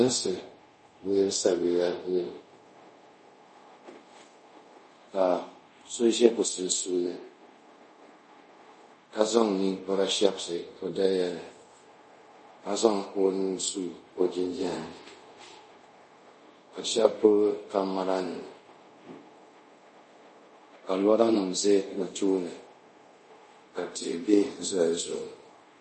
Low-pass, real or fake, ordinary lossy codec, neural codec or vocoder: 10.8 kHz; fake; MP3, 32 kbps; codec, 24 kHz, 0.5 kbps, DualCodec